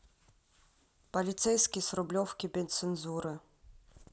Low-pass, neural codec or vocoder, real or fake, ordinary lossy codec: none; none; real; none